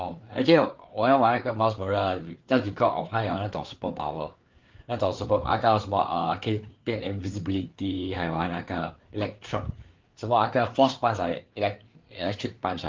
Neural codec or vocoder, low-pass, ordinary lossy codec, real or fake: codec, 16 kHz, 4 kbps, FreqCodec, larger model; 7.2 kHz; Opus, 32 kbps; fake